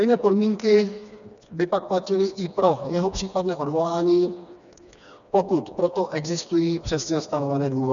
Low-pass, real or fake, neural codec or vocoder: 7.2 kHz; fake; codec, 16 kHz, 2 kbps, FreqCodec, smaller model